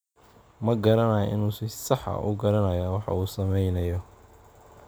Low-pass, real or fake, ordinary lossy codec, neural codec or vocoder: none; real; none; none